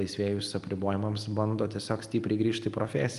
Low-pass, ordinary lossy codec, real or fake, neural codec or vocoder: 10.8 kHz; Opus, 32 kbps; real; none